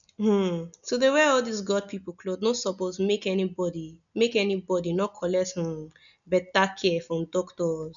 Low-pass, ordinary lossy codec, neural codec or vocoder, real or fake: 7.2 kHz; none; none; real